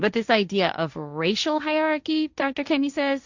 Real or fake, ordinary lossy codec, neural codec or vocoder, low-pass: fake; Opus, 64 kbps; codec, 16 kHz, 1.1 kbps, Voila-Tokenizer; 7.2 kHz